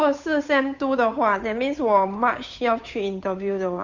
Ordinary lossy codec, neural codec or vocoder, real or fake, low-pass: MP3, 64 kbps; codec, 16 kHz, 8 kbps, FunCodec, trained on Chinese and English, 25 frames a second; fake; 7.2 kHz